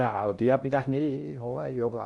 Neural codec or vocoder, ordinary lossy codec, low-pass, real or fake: codec, 16 kHz in and 24 kHz out, 0.6 kbps, FocalCodec, streaming, 2048 codes; none; 10.8 kHz; fake